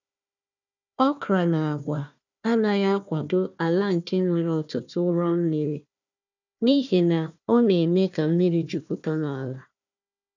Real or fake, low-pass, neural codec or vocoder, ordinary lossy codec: fake; 7.2 kHz; codec, 16 kHz, 1 kbps, FunCodec, trained on Chinese and English, 50 frames a second; none